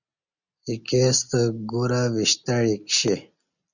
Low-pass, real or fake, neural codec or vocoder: 7.2 kHz; real; none